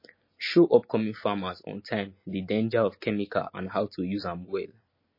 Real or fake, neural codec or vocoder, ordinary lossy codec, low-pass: real; none; MP3, 24 kbps; 5.4 kHz